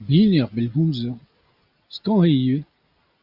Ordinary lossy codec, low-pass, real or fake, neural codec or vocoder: Opus, 64 kbps; 5.4 kHz; real; none